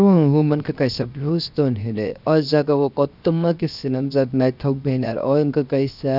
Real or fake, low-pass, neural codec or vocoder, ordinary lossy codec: fake; 5.4 kHz; codec, 16 kHz, 0.7 kbps, FocalCodec; none